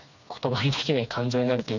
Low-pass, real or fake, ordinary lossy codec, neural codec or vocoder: 7.2 kHz; fake; none; codec, 16 kHz, 2 kbps, FreqCodec, smaller model